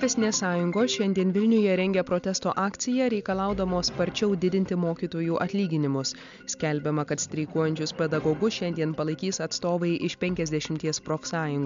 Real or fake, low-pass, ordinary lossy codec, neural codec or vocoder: real; 7.2 kHz; MP3, 64 kbps; none